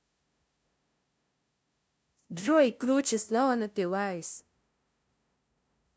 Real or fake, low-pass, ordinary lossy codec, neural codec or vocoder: fake; none; none; codec, 16 kHz, 0.5 kbps, FunCodec, trained on LibriTTS, 25 frames a second